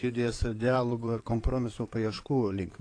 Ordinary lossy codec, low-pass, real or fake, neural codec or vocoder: AAC, 48 kbps; 9.9 kHz; fake; codec, 16 kHz in and 24 kHz out, 2.2 kbps, FireRedTTS-2 codec